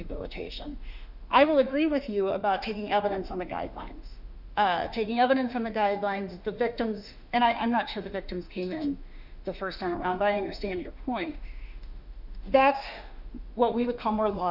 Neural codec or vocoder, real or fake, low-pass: autoencoder, 48 kHz, 32 numbers a frame, DAC-VAE, trained on Japanese speech; fake; 5.4 kHz